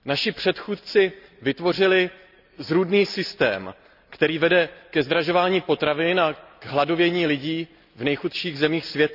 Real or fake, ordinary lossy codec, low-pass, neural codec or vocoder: real; none; 5.4 kHz; none